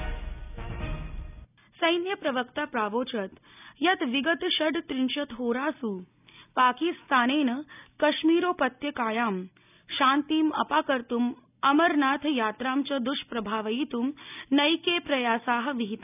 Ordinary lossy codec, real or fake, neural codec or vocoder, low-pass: none; real; none; 3.6 kHz